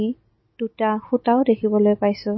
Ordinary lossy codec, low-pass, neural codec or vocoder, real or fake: MP3, 24 kbps; 7.2 kHz; none; real